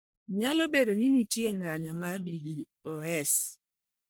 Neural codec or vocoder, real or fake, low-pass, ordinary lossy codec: codec, 44.1 kHz, 1.7 kbps, Pupu-Codec; fake; none; none